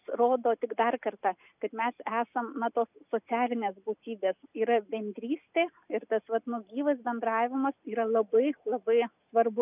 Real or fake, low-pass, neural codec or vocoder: real; 3.6 kHz; none